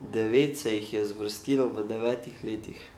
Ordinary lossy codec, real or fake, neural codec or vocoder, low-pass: MP3, 96 kbps; fake; codec, 44.1 kHz, 7.8 kbps, DAC; 19.8 kHz